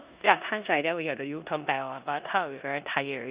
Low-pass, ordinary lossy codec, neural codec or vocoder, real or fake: 3.6 kHz; Opus, 64 kbps; codec, 16 kHz in and 24 kHz out, 0.9 kbps, LongCat-Audio-Codec, four codebook decoder; fake